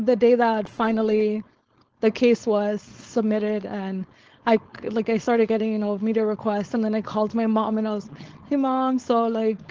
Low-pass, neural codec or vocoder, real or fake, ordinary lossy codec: 7.2 kHz; codec, 16 kHz, 4.8 kbps, FACodec; fake; Opus, 16 kbps